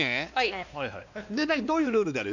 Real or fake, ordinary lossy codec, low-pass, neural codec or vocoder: fake; none; 7.2 kHz; codec, 16 kHz, 2 kbps, X-Codec, WavLM features, trained on Multilingual LibriSpeech